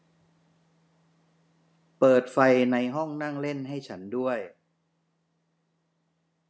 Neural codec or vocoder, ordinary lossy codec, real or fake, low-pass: none; none; real; none